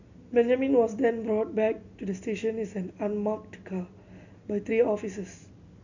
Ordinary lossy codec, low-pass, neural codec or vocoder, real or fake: none; 7.2 kHz; none; real